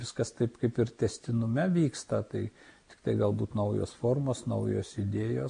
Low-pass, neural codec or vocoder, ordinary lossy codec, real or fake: 9.9 kHz; none; MP3, 48 kbps; real